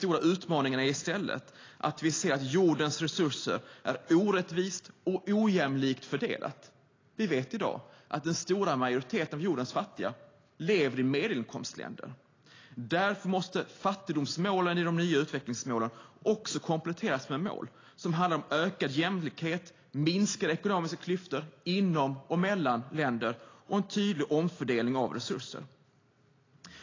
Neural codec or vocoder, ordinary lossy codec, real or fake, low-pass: none; AAC, 32 kbps; real; 7.2 kHz